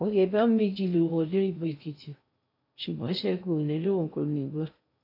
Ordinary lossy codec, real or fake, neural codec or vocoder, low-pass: AAC, 48 kbps; fake; codec, 16 kHz in and 24 kHz out, 0.6 kbps, FocalCodec, streaming, 2048 codes; 5.4 kHz